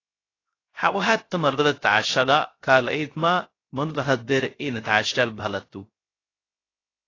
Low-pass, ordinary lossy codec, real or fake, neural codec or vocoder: 7.2 kHz; AAC, 32 kbps; fake; codec, 16 kHz, 0.3 kbps, FocalCodec